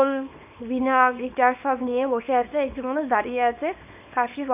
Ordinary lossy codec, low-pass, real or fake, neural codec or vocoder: none; 3.6 kHz; fake; codec, 24 kHz, 0.9 kbps, WavTokenizer, small release